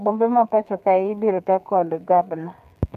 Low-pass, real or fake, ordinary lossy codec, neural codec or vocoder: 14.4 kHz; fake; none; codec, 32 kHz, 1.9 kbps, SNAC